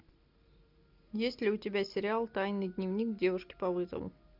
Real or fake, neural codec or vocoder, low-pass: real; none; 5.4 kHz